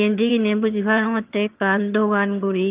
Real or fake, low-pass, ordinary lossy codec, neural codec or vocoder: fake; 3.6 kHz; Opus, 24 kbps; vocoder, 22.05 kHz, 80 mel bands, HiFi-GAN